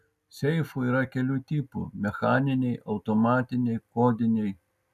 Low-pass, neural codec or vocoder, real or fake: 14.4 kHz; none; real